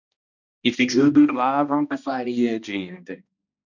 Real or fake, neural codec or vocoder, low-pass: fake; codec, 16 kHz, 1 kbps, X-Codec, HuBERT features, trained on balanced general audio; 7.2 kHz